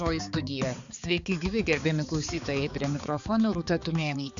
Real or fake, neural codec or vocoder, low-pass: fake; codec, 16 kHz, 4 kbps, X-Codec, HuBERT features, trained on balanced general audio; 7.2 kHz